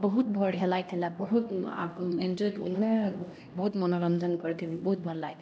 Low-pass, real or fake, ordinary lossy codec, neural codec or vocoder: none; fake; none; codec, 16 kHz, 1 kbps, X-Codec, HuBERT features, trained on LibriSpeech